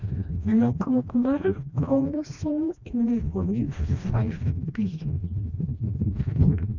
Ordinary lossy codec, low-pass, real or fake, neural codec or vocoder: none; 7.2 kHz; fake; codec, 16 kHz, 1 kbps, FreqCodec, smaller model